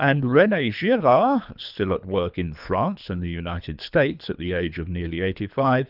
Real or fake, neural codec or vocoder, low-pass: fake; codec, 24 kHz, 3 kbps, HILCodec; 5.4 kHz